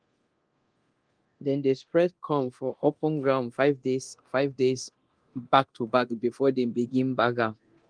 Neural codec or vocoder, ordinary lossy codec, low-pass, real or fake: codec, 24 kHz, 0.9 kbps, DualCodec; Opus, 24 kbps; 9.9 kHz; fake